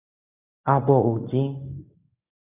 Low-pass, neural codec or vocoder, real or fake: 3.6 kHz; codec, 24 kHz, 0.9 kbps, WavTokenizer, medium speech release version 1; fake